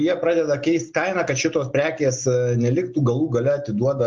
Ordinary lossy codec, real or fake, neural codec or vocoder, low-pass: Opus, 32 kbps; real; none; 7.2 kHz